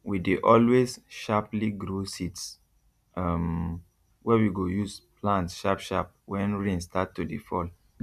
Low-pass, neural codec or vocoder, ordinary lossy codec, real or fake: 14.4 kHz; none; none; real